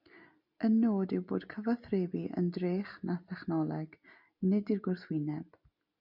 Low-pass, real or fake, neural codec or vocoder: 5.4 kHz; real; none